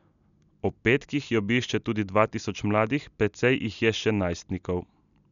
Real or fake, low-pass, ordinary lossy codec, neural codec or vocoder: real; 7.2 kHz; none; none